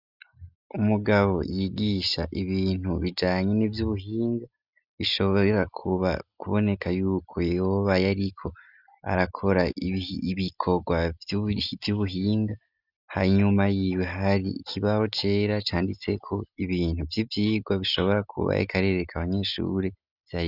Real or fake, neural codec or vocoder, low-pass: real; none; 5.4 kHz